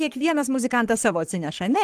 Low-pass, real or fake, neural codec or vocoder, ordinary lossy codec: 14.4 kHz; fake; codec, 44.1 kHz, 3.4 kbps, Pupu-Codec; Opus, 24 kbps